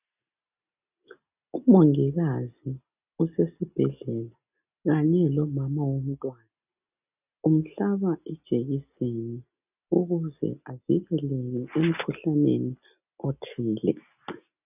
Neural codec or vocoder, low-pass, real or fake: none; 3.6 kHz; real